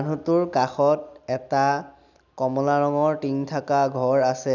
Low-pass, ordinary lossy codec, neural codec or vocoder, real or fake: 7.2 kHz; none; none; real